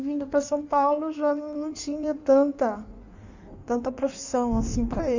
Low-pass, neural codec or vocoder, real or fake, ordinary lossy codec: 7.2 kHz; codec, 16 kHz in and 24 kHz out, 1.1 kbps, FireRedTTS-2 codec; fake; none